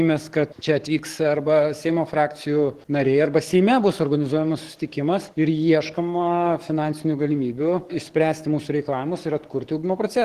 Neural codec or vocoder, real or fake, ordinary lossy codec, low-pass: codec, 44.1 kHz, 7.8 kbps, DAC; fake; Opus, 16 kbps; 14.4 kHz